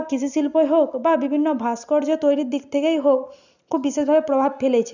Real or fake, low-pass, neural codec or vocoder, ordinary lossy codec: real; 7.2 kHz; none; none